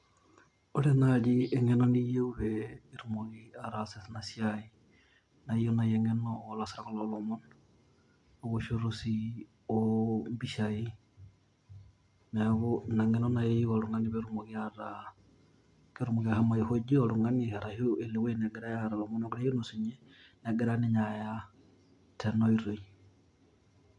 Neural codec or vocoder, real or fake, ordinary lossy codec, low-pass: none; real; MP3, 64 kbps; 10.8 kHz